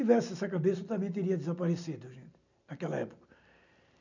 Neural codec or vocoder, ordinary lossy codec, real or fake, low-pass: none; none; real; 7.2 kHz